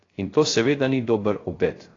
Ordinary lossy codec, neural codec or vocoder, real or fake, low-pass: AAC, 32 kbps; codec, 16 kHz, 0.3 kbps, FocalCodec; fake; 7.2 kHz